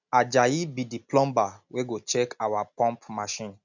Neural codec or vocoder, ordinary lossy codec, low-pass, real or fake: none; none; 7.2 kHz; real